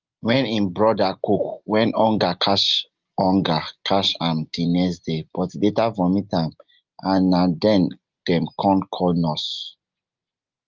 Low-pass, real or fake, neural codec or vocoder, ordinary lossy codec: 7.2 kHz; real; none; Opus, 32 kbps